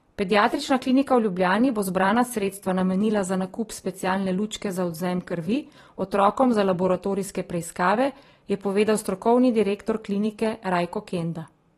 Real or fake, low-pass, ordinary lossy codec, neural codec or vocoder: fake; 19.8 kHz; AAC, 32 kbps; vocoder, 44.1 kHz, 128 mel bands every 512 samples, BigVGAN v2